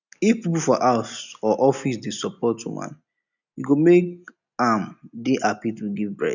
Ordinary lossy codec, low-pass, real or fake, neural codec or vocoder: none; 7.2 kHz; real; none